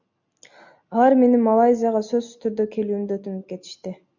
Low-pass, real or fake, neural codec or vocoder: 7.2 kHz; real; none